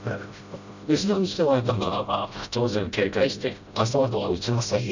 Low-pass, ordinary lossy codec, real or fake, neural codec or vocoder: 7.2 kHz; none; fake; codec, 16 kHz, 0.5 kbps, FreqCodec, smaller model